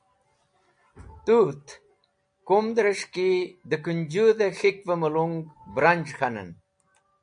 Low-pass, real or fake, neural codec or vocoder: 9.9 kHz; real; none